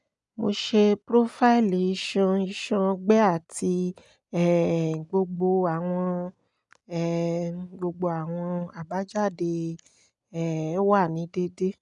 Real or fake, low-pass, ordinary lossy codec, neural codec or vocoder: real; 10.8 kHz; none; none